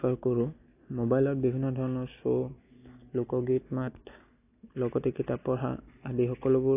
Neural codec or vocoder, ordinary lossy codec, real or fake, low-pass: none; AAC, 24 kbps; real; 3.6 kHz